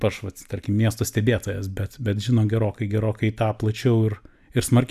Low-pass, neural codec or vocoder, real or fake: 14.4 kHz; none; real